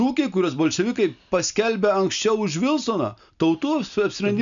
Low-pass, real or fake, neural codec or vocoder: 7.2 kHz; real; none